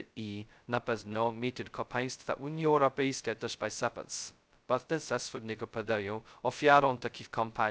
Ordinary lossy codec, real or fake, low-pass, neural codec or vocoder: none; fake; none; codec, 16 kHz, 0.2 kbps, FocalCodec